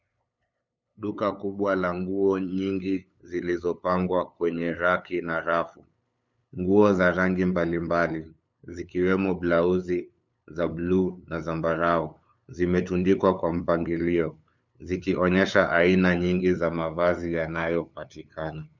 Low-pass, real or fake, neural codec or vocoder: 7.2 kHz; fake; codec, 16 kHz, 8 kbps, FunCodec, trained on LibriTTS, 25 frames a second